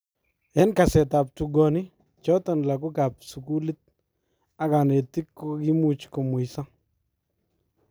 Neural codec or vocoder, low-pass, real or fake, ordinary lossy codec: none; none; real; none